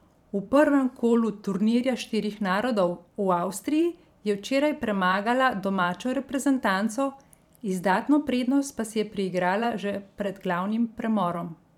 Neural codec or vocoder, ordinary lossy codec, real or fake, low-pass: vocoder, 44.1 kHz, 128 mel bands every 512 samples, BigVGAN v2; none; fake; 19.8 kHz